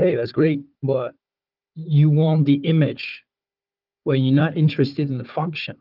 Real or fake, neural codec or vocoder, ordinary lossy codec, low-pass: fake; codec, 16 kHz, 4 kbps, FunCodec, trained on Chinese and English, 50 frames a second; Opus, 32 kbps; 5.4 kHz